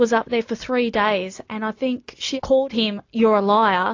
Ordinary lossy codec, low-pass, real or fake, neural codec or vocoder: AAC, 48 kbps; 7.2 kHz; real; none